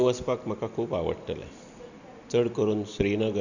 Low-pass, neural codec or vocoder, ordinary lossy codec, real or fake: 7.2 kHz; none; none; real